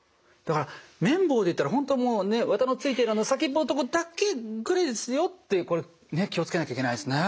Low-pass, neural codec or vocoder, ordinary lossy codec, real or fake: none; none; none; real